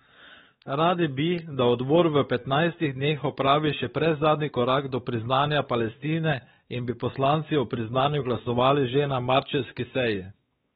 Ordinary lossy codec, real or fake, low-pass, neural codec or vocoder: AAC, 16 kbps; fake; 7.2 kHz; codec, 16 kHz, 4 kbps, X-Codec, WavLM features, trained on Multilingual LibriSpeech